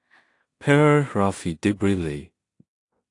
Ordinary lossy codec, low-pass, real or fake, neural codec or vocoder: AAC, 48 kbps; 10.8 kHz; fake; codec, 16 kHz in and 24 kHz out, 0.4 kbps, LongCat-Audio-Codec, two codebook decoder